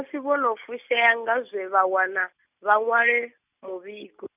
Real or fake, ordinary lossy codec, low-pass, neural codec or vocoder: real; none; 3.6 kHz; none